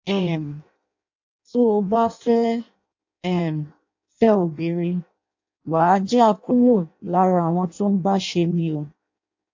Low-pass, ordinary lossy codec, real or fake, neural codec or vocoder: 7.2 kHz; AAC, 48 kbps; fake; codec, 16 kHz in and 24 kHz out, 0.6 kbps, FireRedTTS-2 codec